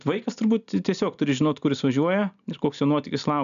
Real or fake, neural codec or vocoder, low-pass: real; none; 7.2 kHz